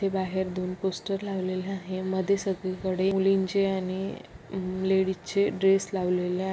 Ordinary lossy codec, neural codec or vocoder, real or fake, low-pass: none; none; real; none